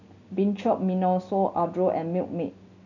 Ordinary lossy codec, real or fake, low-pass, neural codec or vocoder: none; real; 7.2 kHz; none